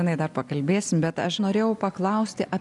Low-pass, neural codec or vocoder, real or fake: 10.8 kHz; none; real